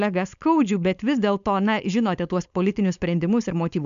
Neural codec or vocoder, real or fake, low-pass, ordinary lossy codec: codec, 16 kHz, 4.8 kbps, FACodec; fake; 7.2 kHz; AAC, 96 kbps